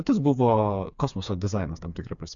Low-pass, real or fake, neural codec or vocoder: 7.2 kHz; fake; codec, 16 kHz, 4 kbps, FreqCodec, smaller model